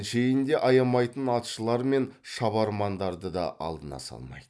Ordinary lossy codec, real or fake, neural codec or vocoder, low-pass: none; real; none; none